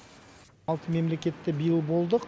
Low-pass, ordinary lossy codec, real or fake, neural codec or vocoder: none; none; real; none